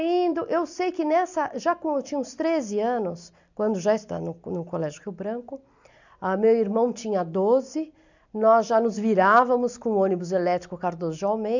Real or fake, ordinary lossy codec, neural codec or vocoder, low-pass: real; none; none; 7.2 kHz